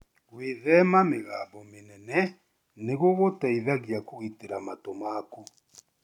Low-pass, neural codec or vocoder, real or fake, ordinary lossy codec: 19.8 kHz; none; real; none